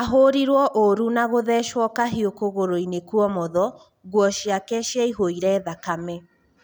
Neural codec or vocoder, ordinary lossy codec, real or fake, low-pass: none; none; real; none